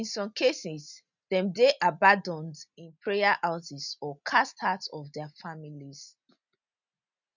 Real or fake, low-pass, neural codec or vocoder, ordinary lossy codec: real; 7.2 kHz; none; none